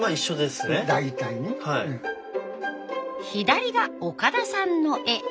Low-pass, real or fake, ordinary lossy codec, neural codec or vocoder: none; real; none; none